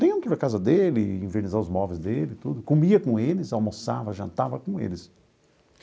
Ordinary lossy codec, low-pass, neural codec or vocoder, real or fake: none; none; none; real